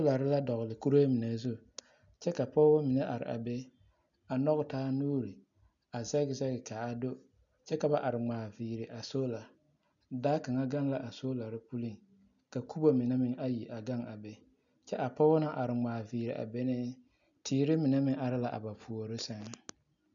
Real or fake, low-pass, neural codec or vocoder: real; 7.2 kHz; none